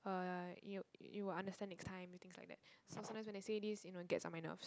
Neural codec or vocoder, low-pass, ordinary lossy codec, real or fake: none; none; none; real